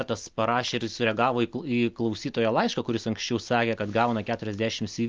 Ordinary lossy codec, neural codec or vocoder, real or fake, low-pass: Opus, 24 kbps; none; real; 7.2 kHz